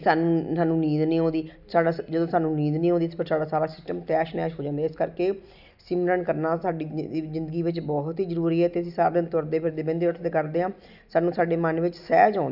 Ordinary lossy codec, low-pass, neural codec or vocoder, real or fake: none; 5.4 kHz; none; real